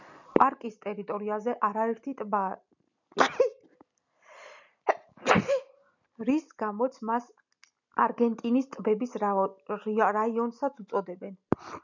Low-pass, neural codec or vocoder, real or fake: 7.2 kHz; none; real